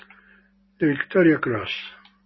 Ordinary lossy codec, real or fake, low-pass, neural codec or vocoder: MP3, 24 kbps; real; 7.2 kHz; none